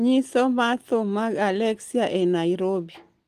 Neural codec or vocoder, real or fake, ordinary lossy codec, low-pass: none; real; Opus, 24 kbps; 14.4 kHz